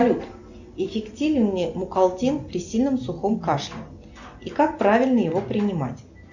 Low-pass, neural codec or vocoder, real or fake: 7.2 kHz; none; real